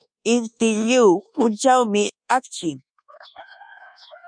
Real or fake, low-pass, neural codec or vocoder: fake; 9.9 kHz; codec, 24 kHz, 1.2 kbps, DualCodec